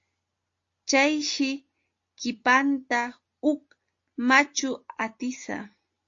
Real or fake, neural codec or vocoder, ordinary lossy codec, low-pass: real; none; AAC, 48 kbps; 7.2 kHz